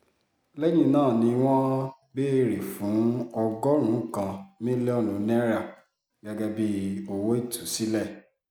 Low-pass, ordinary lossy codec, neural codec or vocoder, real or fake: none; none; none; real